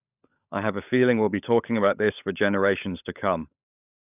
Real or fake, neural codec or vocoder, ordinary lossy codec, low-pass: fake; codec, 16 kHz, 16 kbps, FunCodec, trained on LibriTTS, 50 frames a second; none; 3.6 kHz